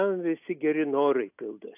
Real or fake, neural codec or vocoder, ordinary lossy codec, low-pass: real; none; AAC, 32 kbps; 3.6 kHz